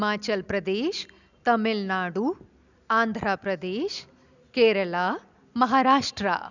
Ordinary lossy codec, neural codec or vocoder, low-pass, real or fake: none; none; 7.2 kHz; real